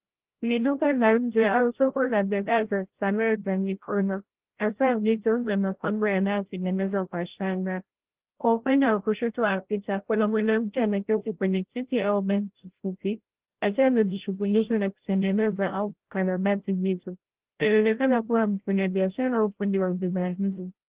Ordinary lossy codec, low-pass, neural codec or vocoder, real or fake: Opus, 16 kbps; 3.6 kHz; codec, 16 kHz, 0.5 kbps, FreqCodec, larger model; fake